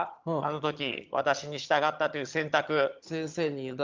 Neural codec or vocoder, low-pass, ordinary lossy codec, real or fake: vocoder, 22.05 kHz, 80 mel bands, HiFi-GAN; 7.2 kHz; Opus, 24 kbps; fake